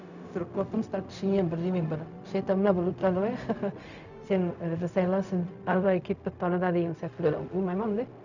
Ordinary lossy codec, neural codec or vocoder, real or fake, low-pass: none; codec, 16 kHz, 0.4 kbps, LongCat-Audio-Codec; fake; 7.2 kHz